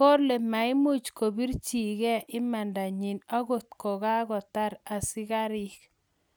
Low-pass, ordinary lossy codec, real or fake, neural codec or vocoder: none; none; real; none